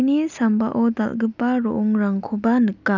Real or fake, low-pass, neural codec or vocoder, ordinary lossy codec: real; 7.2 kHz; none; none